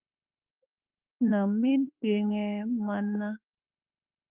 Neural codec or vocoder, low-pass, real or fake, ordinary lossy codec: autoencoder, 48 kHz, 32 numbers a frame, DAC-VAE, trained on Japanese speech; 3.6 kHz; fake; Opus, 32 kbps